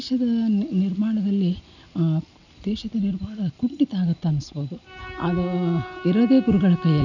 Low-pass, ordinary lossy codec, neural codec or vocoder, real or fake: 7.2 kHz; none; none; real